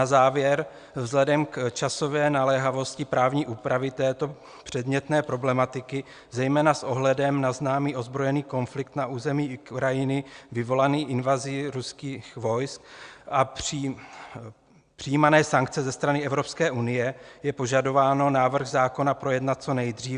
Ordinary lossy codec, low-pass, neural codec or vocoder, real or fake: Opus, 64 kbps; 9.9 kHz; none; real